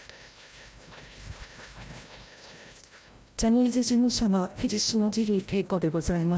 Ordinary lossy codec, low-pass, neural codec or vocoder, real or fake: none; none; codec, 16 kHz, 0.5 kbps, FreqCodec, larger model; fake